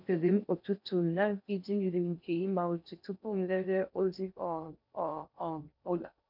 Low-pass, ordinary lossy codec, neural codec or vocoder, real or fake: 5.4 kHz; none; codec, 16 kHz in and 24 kHz out, 0.6 kbps, FocalCodec, streaming, 4096 codes; fake